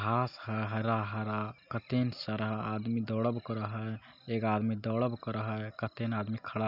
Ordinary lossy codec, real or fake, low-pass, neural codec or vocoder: none; real; 5.4 kHz; none